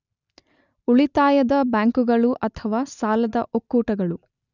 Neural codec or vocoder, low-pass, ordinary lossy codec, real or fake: none; 7.2 kHz; none; real